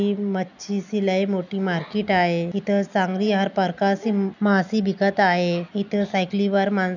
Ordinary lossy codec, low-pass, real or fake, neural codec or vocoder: none; 7.2 kHz; real; none